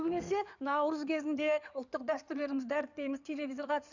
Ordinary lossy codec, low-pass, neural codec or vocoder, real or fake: none; 7.2 kHz; codec, 16 kHz in and 24 kHz out, 2.2 kbps, FireRedTTS-2 codec; fake